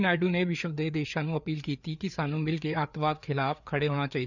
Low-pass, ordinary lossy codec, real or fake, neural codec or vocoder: 7.2 kHz; none; fake; codec, 16 kHz, 4 kbps, FreqCodec, larger model